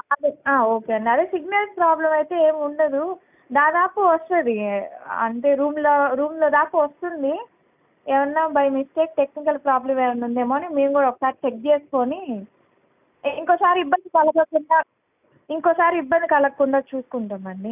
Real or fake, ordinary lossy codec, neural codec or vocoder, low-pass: real; none; none; 3.6 kHz